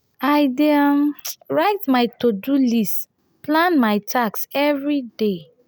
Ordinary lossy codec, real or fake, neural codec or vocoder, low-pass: none; real; none; none